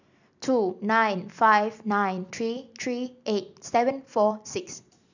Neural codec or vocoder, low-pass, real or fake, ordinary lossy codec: none; 7.2 kHz; real; none